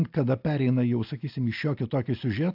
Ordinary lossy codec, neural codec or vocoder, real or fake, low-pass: AAC, 48 kbps; none; real; 5.4 kHz